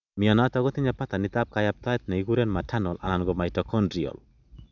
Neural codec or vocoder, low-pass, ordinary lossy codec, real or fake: none; 7.2 kHz; none; real